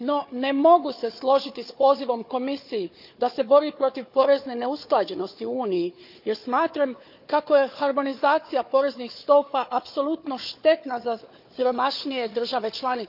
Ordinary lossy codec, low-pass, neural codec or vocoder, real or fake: none; 5.4 kHz; codec, 16 kHz, 4 kbps, FunCodec, trained on Chinese and English, 50 frames a second; fake